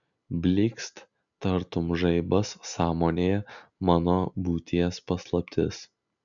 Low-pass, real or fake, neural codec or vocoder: 7.2 kHz; real; none